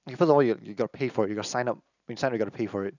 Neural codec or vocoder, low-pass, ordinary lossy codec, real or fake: none; 7.2 kHz; none; real